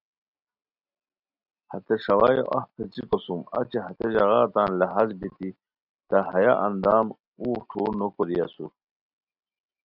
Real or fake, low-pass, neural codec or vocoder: real; 5.4 kHz; none